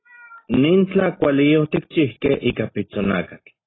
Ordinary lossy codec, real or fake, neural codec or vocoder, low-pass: AAC, 16 kbps; real; none; 7.2 kHz